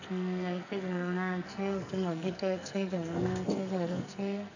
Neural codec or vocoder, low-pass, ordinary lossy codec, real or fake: codec, 44.1 kHz, 2.6 kbps, SNAC; 7.2 kHz; none; fake